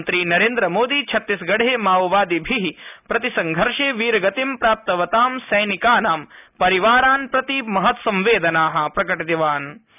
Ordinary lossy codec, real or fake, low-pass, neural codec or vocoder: none; real; 3.6 kHz; none